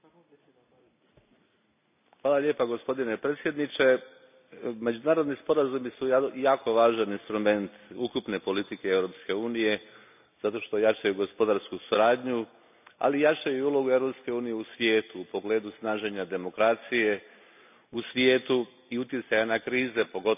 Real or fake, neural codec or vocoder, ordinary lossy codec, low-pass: real; none; none; 3.6 kHz